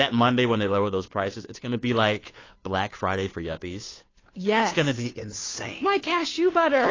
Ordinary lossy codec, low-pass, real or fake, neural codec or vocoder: AAC, 32 kbps; 7.2 kHz; fake; codec, 16 kHz, 2 kbps, FunCodec, trained on Chinese and English, 25 frames a second